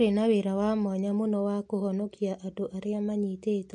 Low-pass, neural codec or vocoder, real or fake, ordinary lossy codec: 10.8 kHz; none; real; MP3, 48 kbps